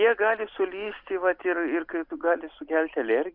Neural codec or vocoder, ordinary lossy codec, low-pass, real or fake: none; Opus, 64 kbps; 5.4 kHz; real